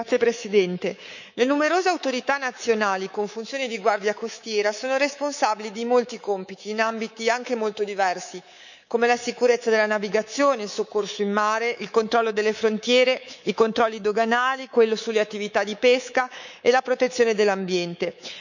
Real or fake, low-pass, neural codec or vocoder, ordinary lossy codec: fake; 7.2 kHz; codec, 24 kHz, 3.1 kbps, DualCodec; none